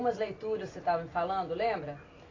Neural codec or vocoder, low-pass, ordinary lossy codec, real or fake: none; 7.2 kHz; AAC, 32 kbps; real